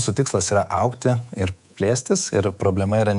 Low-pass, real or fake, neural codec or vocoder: 10.8 kHz; fake; codec, 24 kHz, 3.1 kbps, DualCodec